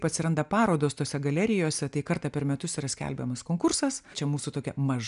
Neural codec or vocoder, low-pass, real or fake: none; 10.8 kHz; real